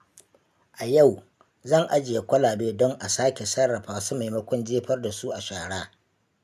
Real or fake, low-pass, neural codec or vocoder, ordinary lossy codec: real; 14.4 kHz; none; none